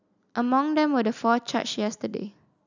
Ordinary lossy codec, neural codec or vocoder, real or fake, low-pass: none; none; real; 7.2 kHz